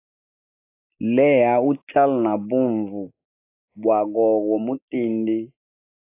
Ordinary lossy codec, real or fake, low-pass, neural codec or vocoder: AAC, 24 kbps; real; 3.6 kHz; none